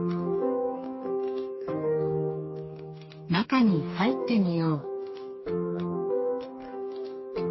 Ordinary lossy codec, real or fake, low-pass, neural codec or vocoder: MP3, 24 kbps; fake; 7.2 kHz; codec, 44.1 kHz, 2.6 kbps, DAC